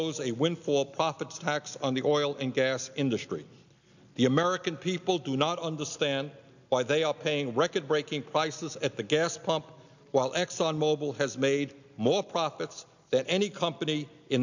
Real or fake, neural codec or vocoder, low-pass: real; none; 7.2 kHz